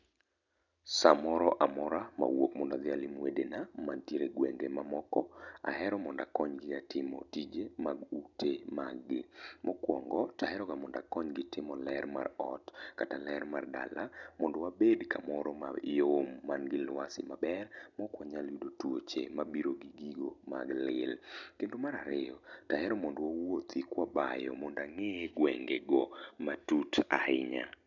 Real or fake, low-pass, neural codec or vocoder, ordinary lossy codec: real; 7.2 kHz; none; none